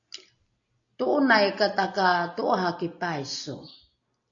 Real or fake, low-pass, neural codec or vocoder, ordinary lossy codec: real; 7.2 kHz; none; AAC, 48 kbps